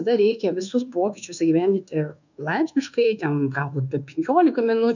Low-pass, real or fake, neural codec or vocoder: 7.2 kHz; fake; codec, 24 kHz, 1.2 kbps, DualCodec